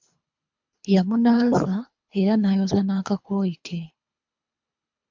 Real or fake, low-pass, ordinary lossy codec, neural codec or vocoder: fake; 7.2 kHz; MP3, 64 kbps; codec, 24 kHz, 3 kbps, HILCodec